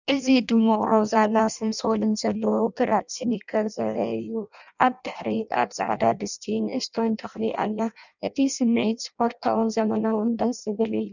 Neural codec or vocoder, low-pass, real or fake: codec, 16 kHz in and 24 kHz out, 0.6 kbps, FireRedTTS-2 codec; 7.2 kHz; fake